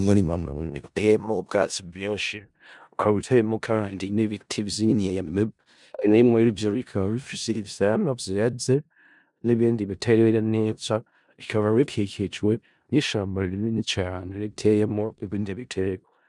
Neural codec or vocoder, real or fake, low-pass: codec, 16 kHz in and 24 kHz out, 0.4 kbps, LongCat-Audio-Codec, four codebook decoder; fake; 10.8 kHz